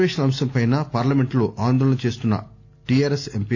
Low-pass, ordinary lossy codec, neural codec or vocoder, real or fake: none; none; none; real